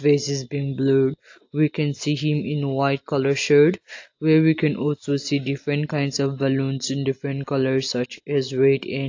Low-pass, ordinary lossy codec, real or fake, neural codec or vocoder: 7.2 kHz; AAC, 48 kbps; real; none